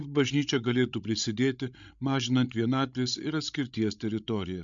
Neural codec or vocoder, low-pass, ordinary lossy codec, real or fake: codec, 16 kHz, 16 kbps, FreqCodec, larger model; 7.2 kHz; MP3, 64 kbps; fake